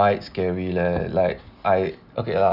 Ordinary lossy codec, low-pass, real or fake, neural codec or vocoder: none; 5.4 kHz; real; none